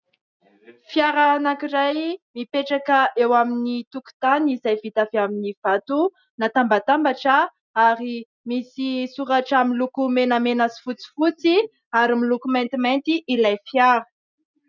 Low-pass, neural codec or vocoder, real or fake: 7.2 kHz; none; real